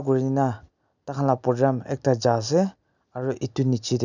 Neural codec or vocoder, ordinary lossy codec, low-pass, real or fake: none; none; 7.2 kHz; real